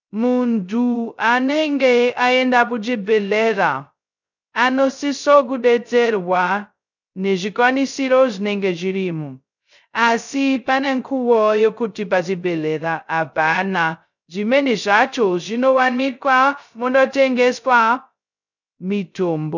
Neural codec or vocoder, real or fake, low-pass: codec, 16 kHz, 0.2 kbps, FocalCodec; fake; 7.2 kHz